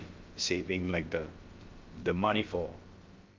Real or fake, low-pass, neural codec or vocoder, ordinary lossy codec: fake; 7.2 kHz; codec, 16 kHz, about 1 kbps, DyCAST, with the encoder's durations; Opus, 32 kbps